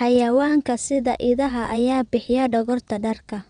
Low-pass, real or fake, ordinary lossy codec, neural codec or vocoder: 9.9 kHz; fake; none; vocoder, 22.05 kHz, 80 mel bands, WaveNeXt